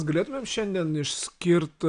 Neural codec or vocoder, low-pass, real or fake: none; 9.9 kHz; real